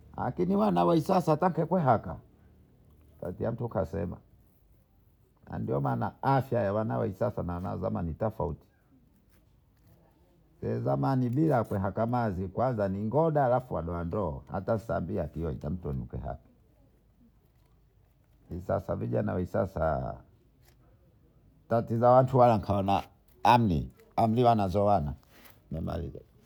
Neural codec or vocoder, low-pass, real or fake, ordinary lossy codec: none; none; real; none